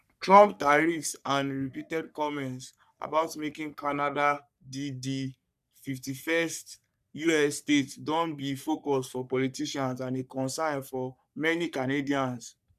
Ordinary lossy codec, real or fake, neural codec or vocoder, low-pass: none; fake; codec, 44.1 kHz, 3.4 kbps, Pupu-Codec; 14.4 kHz